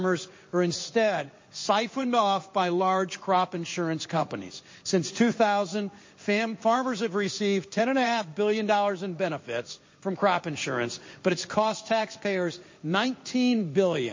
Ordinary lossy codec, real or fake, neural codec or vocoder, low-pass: MP3, 32 kbps; fake; codec, 16 kHz in and 24 kHz out, 1 kbps, XY-Tokenizer; 7.2 kHz